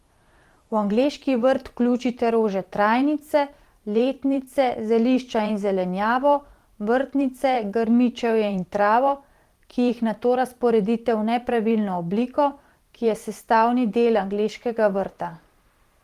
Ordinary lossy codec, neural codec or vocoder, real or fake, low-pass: Opus, 24 kbps; vocoder, 44.1 kHz, 128 mel bands every 512 samples, BigVGAN v2; fake; 14.4 kHz